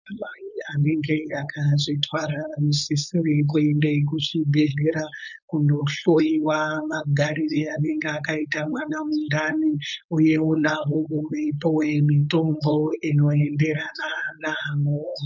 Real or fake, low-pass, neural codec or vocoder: fake; 7.2 kHz; codec, 16 kHz, 4.8 kbps, FACodec